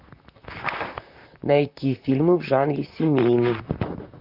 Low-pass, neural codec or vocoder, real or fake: 5.4 kHz; vocoder, 44.1 kHz, 128 mel bands, Pupu-Vocoder; fake